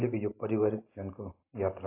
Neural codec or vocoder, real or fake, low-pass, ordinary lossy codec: none; real; 3.6 kHz; AAC, 24 kbps